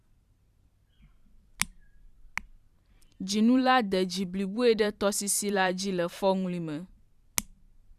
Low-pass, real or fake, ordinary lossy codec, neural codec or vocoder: 14.4 kHz; fake; Opus, 64 kbps; vocoder, 44.1 kHz, 128 mel bands every 512 samples, BigVGAN v2